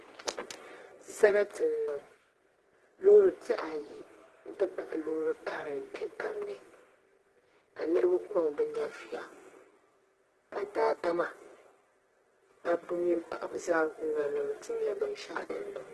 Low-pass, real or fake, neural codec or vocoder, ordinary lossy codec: 10.8 kHz; fake; codec, 24 kHz, 0.9 kbps, WavTokenizer, medium music audio release; Opus, 16 kbps